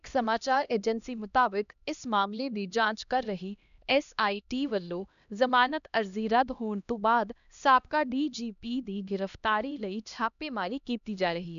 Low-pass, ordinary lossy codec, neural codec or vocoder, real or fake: 7.2 kHz; none; codec, 16 kHz, 1 kbps, X-Codec, HuBERT features, trained on LibriSpeech; fake